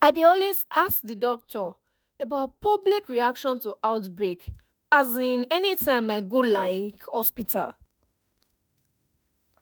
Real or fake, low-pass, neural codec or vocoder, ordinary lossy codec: fake; none; autoencoder, 48 kHz, 32 numbers a frame, DAC-VAE, trained on Japanese speech; none